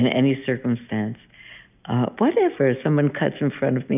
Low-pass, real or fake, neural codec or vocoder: 3.6 kHz; real; none